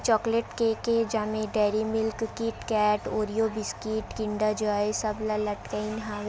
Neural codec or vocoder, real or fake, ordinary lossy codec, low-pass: none; real; none; none